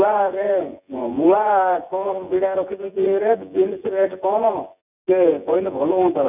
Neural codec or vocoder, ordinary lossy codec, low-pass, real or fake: vocoder, 24 kHz, 100 mel bands, Vocos; none; 3.6 kHz; fake